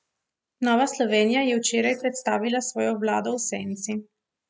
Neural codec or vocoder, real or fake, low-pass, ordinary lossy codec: none; real; none; none